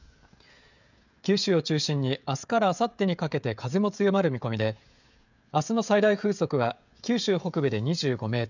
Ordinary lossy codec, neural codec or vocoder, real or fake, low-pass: none; codec, 16 kHz, 16 kbps, FreqCodec, smaller model; fake; 7.2 kHz